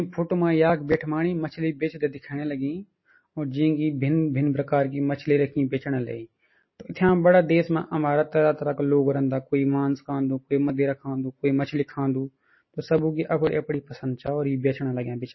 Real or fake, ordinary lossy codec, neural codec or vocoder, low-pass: real; MP3, 24 kbps; none; 7.2 kHz